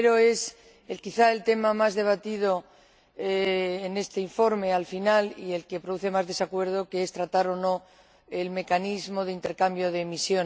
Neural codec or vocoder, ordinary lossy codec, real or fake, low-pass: none; none; real; none